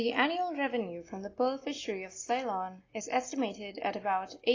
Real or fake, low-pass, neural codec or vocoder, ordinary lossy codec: real; 7.2 kHz; none; AAC, 32 kbps